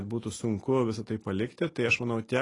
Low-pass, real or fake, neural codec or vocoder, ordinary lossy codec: 10.8 kHz; real; none; AAC, 32 kbps